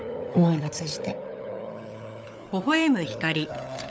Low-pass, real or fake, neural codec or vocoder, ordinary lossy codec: none; fake; codec, 16 kHz, 16 kbps, FunCodec, trained on LibriTTS, 50 frames a second; none